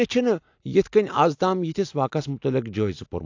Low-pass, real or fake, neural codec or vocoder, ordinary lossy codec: 7.2 kHz; real; none; AAC, 48 kbps